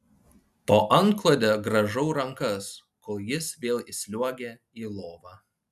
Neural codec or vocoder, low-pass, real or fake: none; 14.4 kHz; real